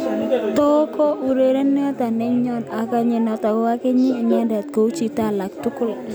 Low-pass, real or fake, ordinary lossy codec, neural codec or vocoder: none; real; none; none